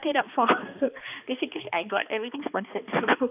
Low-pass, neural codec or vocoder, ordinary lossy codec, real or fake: 3.6 kHz; codec, 16 kHz, 2 kbps, X-Codec, HuBERT features, trained on balanced general audio; none; fake